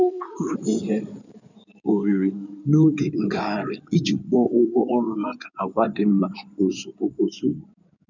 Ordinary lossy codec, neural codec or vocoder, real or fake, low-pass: none; codec, 16 kHz in and 24 kHz out, 2.2 kbps, FireRedTTS-2 codec; fake; 7.2 kHz